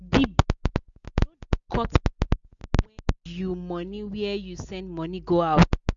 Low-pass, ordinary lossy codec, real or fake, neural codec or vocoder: 7.2 kHz; Opus, 64 kbps; real; none